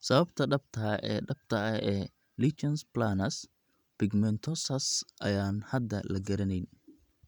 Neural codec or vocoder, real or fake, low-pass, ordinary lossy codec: vocoder, 44.1 kHz, 128 mel bands every 512 samples, BigVGAN v2; fake; 19.8 kHz; none